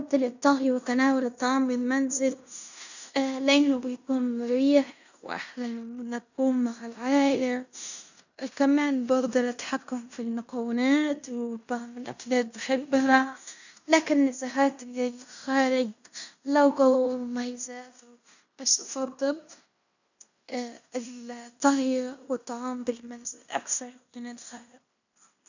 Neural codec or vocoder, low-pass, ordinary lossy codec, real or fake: codec, 16 kHz in and 24 kHz out, 0.9 kbps, LongCat-Audio-Codec, fine tuned four codebook decoder; 7.2 kHz; none; fake